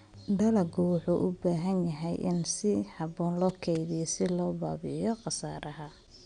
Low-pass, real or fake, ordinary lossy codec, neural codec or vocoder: 9.9 kHz; real; none; none